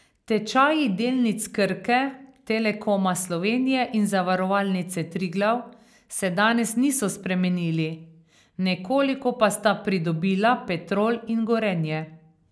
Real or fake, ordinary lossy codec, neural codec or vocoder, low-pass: real; none; none; none